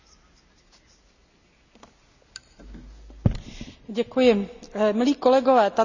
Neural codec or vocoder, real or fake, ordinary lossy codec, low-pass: none; real; none; 7.2 kHz